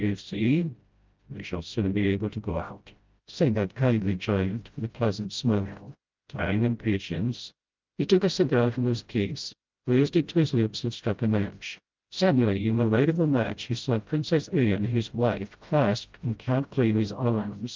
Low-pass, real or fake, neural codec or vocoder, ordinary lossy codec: 7.2 kHz; fake; codec, 16 kHz, 0.5 kbps, FreqCodec, smaller model; Opus, 32 kbps